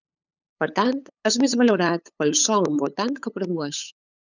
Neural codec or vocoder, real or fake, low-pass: codec, 16 kHz, 8 kbps, FunCodec, trained on LibriTTS, 25 frames a second; fake; 7.2 kHz